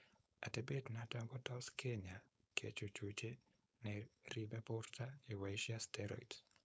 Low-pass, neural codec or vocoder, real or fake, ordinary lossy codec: none; codec, 16 kHz, 4.8 kbps, FACodec; fake; none